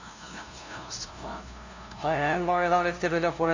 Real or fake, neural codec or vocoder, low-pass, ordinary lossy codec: fake; codec, 16 kHz, 0.5 kbps, FunCodec, trained on LibriTTS, 25 frames a second; 7.2 kHz; Opus, 64 kbps